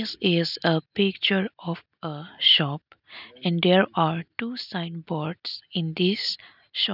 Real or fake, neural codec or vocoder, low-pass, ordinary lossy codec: real; none; 5.4 kHz; none